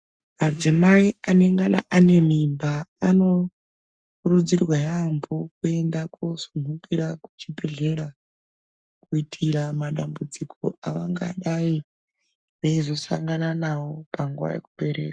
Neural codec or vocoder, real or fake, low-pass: codec, 44.1 kHz, 7.8 kbps, Pupu-Codec; fake; 9.9 kHz